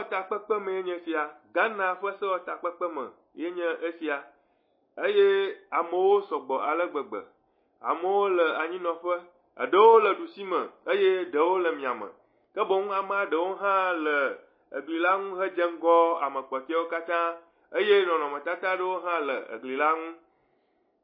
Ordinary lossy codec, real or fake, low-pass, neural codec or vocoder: MP3, 24 kbps; real; 5.4 kHz; none